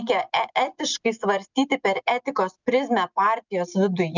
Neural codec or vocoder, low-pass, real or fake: none; 7.2 kHz; real